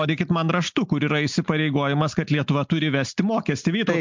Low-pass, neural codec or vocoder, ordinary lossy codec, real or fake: 7.2 kHz; none; MP3, 64 kbps; real